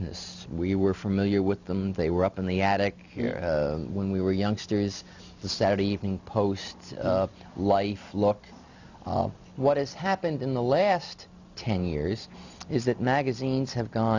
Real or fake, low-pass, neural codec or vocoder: real; 7.2 kHz; none